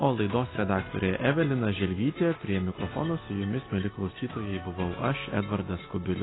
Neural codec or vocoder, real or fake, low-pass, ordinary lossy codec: none; real; 7.2 kHz; AAC, 16 kbps